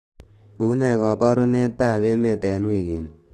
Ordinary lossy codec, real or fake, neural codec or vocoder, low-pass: AAC, 48 kbps; fake; codec, 32 kHz, 1.9 kbps, SNAC; 14.4 kHz